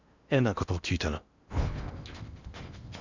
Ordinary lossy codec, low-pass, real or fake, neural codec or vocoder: Opus, 64 kbps; 7.2 kHz; fake; codec, 16 kHz in and 24 kHz out, 0.8 kbps, FocalCodec, streaming, 65536 codes